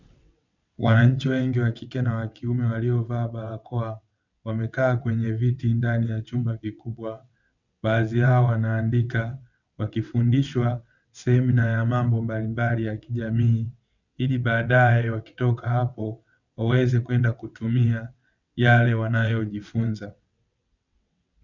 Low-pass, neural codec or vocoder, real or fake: 7.2 kHz; vocoder, 22.05 kHz, 80 mel bands, WaveNeXt; fake